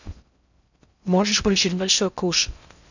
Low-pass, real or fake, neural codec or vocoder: 7.2 kHz; fake; codec, 16 kHz in and 24 kHz out, 0.6 kbps, FocalCodec, streaming, 2048 codes